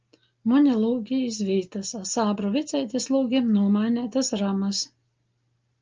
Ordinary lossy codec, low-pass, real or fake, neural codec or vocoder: Opus, 24 kbps; 7.2 kHz; real; none